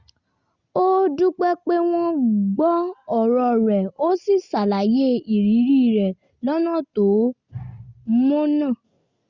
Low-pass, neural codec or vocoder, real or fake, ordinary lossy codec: 7.2 kHz; none; real; Opus, 64 kbps